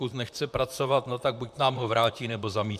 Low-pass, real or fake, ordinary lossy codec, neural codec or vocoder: 14.4 kHz; fake; MP3, 96 kbps; vocoder, 44.1 kHz, 128 mel bands, Pupu-Vocoder